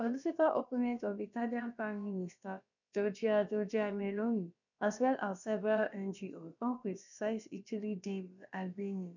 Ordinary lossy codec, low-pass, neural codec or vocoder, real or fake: none; 7.2 kHz; codec, 16 kHz, about 1 kbps, DyCAST, with the encoder's durations; fake